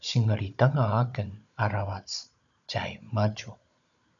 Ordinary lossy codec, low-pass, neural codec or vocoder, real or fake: MP3, 96 kbps; 7.2 kHz; codec, 16 kHz, 16 kbps, FunCodec, trained on Chinese and English, 50 frames a second; fake